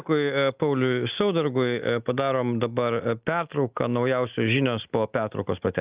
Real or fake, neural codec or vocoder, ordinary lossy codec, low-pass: real; none; Opus, 24 kbps; 3.6 kHz